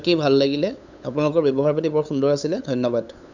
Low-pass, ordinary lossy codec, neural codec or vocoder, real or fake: 7.2 kHz; none; codec, 16 kHz, 8 kbps, FunCodec, trained on LibriTTS, 25 frames a second; fake